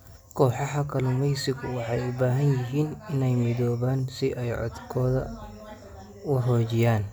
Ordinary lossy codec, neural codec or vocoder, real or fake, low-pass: none; none; real; none